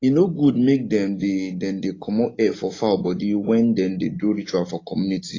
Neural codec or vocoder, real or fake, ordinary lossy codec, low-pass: none; real; AAC, 32 kbps; 7.2 kHz